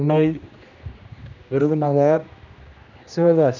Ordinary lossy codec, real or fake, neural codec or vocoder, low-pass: none; fake; codec, 16 kHz, 1 kbps, X-Codec, HuBERT features, trained on general audio; 7.2 kHz